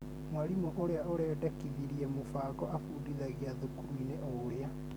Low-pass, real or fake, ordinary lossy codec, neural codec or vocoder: none; fake; none; vocoder, 44.1 kHz, 128 mel bands every 512 samples, BigVGAN v2